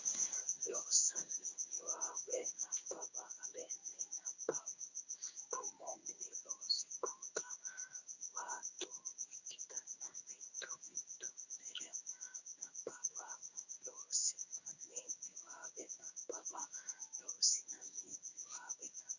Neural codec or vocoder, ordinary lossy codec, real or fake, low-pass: codec, 16 kHz in and 24 kHz out, 1 kbps, XY-Tokenizer; Opus, 64 kbps; fake; 7.2 kHz